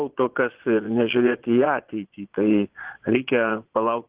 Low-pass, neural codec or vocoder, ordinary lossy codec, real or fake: 3.6 kHz; vocoder, 22.05 kHz, 80 mel bands, Vocos; Opus, 16 kbps; fake